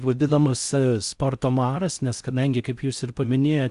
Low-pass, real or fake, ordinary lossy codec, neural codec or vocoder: 10.8 kHz; fake; Opus, 64 kbps; codec, 16 kHz in and 24 kHz out, 0.6 kbps, FocalCodec, streaming, 4096 codes